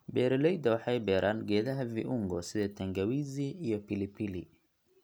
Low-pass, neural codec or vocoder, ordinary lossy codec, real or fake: none; none; none; real